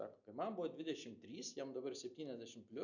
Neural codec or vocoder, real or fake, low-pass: none; real; 7.2 kHz